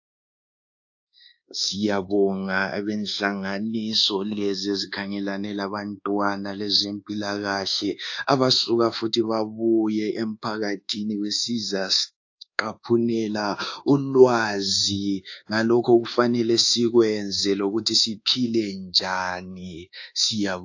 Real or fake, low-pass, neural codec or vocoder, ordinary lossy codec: fake; 7.2 kHz; codec, 24 kHz, 1.2 kbps, DualCodec; AAC, 48 kbps